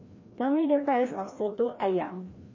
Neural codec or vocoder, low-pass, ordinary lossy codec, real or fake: codec, 16 kHz, 1 kbps, FreqCodec, larger model; 7.2 kHz; MP3, 32 kbps; fake